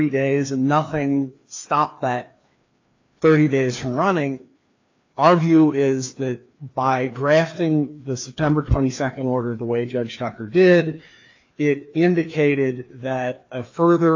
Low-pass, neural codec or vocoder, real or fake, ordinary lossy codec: 7.2 kHz; codec, 16 kHz, 2 kbps, FreqCodec, larger model; fake; AAC, 48 kbps